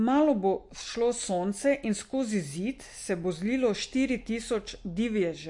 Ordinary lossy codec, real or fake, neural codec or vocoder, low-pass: MP3, 48 kbps; real; none; 10.8 kHz